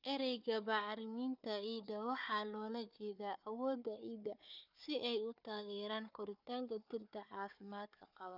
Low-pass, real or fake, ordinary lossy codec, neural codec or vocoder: 5.4 kHz; fake; none; codec, 16 kHz, 4 kbps, FunCodec, trained on Chinese and English, 50 frames a second